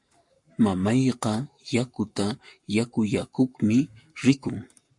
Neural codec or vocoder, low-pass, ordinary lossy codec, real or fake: codec, 44.1 kHz, 7.8 kbps, Pupu-Codec; 10.8 kHz; MP3, 48 kbps; fake